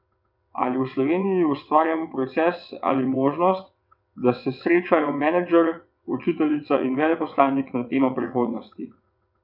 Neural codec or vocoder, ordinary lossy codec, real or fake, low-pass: vocoder, 22.05 kHz, 80 mel bands, WaveNeXt; none; fake; 5.4 kHz